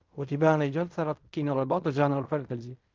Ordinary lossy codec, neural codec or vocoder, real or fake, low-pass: Opus, 32 kbps; codec, 16 kHz in and 24 kHz out, 0.4 kbps, LongCat-Audio-Codec, fine tuned four codebook decoder; fake; 7.2 kHz